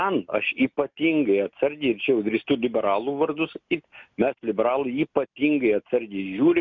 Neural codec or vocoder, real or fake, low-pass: none; real; 7.2 kHz